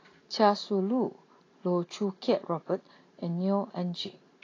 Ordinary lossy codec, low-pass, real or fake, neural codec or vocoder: AAC, 32 kbps; 7.2 kHz; real; none